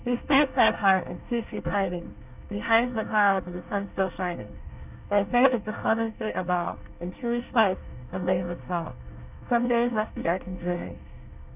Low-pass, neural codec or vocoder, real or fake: 3.6 kHz; codec, 24 kHz, 1 kbps, SNAC; fake